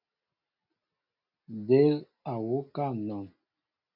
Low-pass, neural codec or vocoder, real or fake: 5.4 kHz; none; real